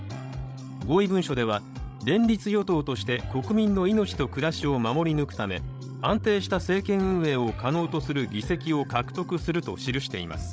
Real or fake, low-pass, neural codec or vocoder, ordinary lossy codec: fake; none; codec, 16 kHz, 16 kbps, FreqCodec, larger model; none